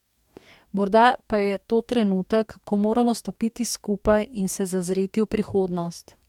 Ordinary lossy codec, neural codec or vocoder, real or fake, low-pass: MP3, 96 kbps; codec, 44.1 kHz, 2.6 kbps, DAC; fake; 19.8 kHz